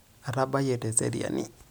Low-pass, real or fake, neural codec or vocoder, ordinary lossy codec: none; real; none; none